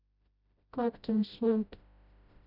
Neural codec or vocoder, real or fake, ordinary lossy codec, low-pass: codec, 16 kHz, 0.5 kbps, FreqCodec, smaller model; fake; none; 5.4 kHz